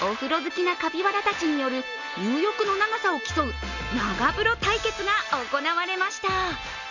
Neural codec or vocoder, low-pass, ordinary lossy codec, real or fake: none; 7.2 kHz; none; real